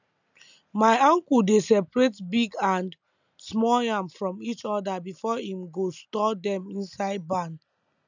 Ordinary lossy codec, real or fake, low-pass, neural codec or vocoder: none; real; 7.2 kHz; none